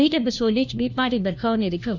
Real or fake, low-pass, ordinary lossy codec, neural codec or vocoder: fake; 7.2 kHz; none; codec, 16 kHz, 1 kbps, FunCodec, trained on LibriTTS, 50 frames a second